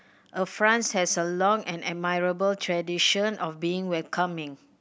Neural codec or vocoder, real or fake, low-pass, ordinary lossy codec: none; real; none; none